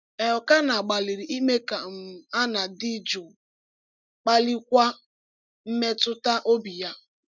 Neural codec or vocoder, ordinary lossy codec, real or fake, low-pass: none; none; real; 7.2 kHz